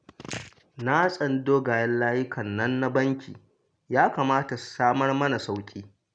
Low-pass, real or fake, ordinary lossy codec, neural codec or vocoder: 9.9 kHz; real; none; none